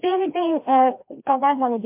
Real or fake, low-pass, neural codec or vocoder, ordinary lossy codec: fake; 3.6 kHz; codec, 16 kHz, 1 kbps, FreqCodec, larger model; MP3, 32 kbps